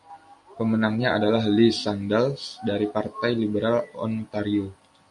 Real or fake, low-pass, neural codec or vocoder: real; 10.8 kHz; none